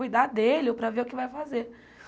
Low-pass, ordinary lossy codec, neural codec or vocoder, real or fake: none; none; none; real